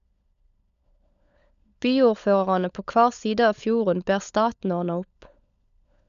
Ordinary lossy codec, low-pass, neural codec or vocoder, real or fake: none; 7.2 kHz; codec, 16 kHz, 16 kbps, FunCodec, trained on LibriTTS, 50 frames a second; fake